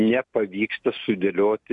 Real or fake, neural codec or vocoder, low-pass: real; none; 10.8 kHz